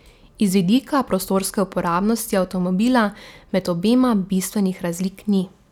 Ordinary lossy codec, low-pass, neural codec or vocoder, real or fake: none; 19.8 kHz; none; real